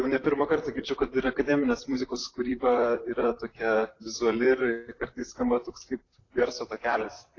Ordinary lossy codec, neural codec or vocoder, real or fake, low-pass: AAC, 32 kbps; vocoder, 44.1 kHz, 128 mel bands, Pupu-Vocoder; fake; 7.2 kHz